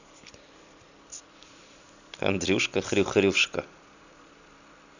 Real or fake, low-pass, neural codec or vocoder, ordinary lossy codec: real; 7.2 kHz; none; none